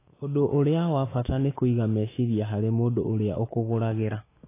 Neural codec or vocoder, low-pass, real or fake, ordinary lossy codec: autoencoder, 48 kHz, 128 numbers a frame, DAC-VAE, trained on Japanese speech; 3.6 kHz; fake; AAC, 16 kbps